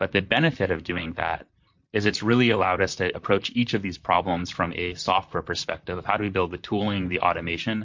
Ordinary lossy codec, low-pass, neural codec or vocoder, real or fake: MP3, 48 kbps; 7.2 kHz; vocoder, 44.1 kHz, 128 mel bands, Pupu-Vocoder; fake